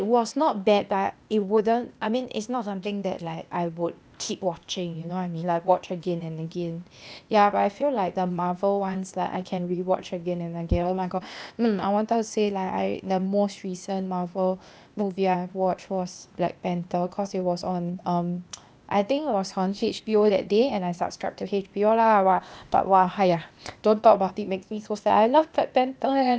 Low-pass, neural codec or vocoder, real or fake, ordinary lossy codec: none; codec, 16 kHz, 0.8 kbps, ZipCodec; fake; none